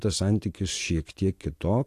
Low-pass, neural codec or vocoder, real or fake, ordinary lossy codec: 14.4 kHz; none; real; AAC, 64 kbps